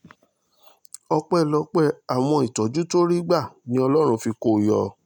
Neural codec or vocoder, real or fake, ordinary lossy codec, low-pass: none; real; none; 19.8 kHz